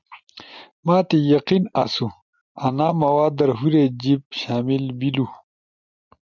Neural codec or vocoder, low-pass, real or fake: none; 7.2 kHz; real